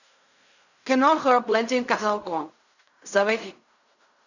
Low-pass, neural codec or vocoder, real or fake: 7.2 kHz; codec, 16 kHz in and 24 kHz out, 0.4 kbps, LongCat-Audio-Codec, fine tuned four codebook decoder; fake